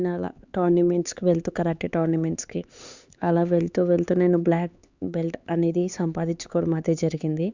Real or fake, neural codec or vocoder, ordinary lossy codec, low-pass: fake; codec, 16 kHz, 4 kbps, X-Codec, HuBERT features, trained on LibriSpeech; Opus, 64 kbps; 7.2 kHz